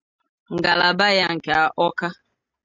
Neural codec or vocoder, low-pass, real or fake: none; 7.2 kHz; real